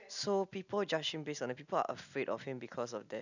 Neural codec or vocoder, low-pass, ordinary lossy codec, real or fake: none; 7.2 kHz; none; real